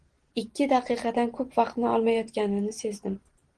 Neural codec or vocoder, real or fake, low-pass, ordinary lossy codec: none; real; 9.9 kHz; Opus, 16 kbps